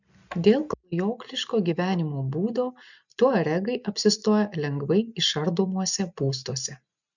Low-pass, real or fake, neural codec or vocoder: 7.2 kHz; real; none